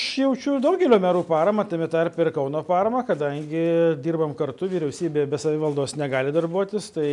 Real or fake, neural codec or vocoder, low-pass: real; none; 10.8 kHz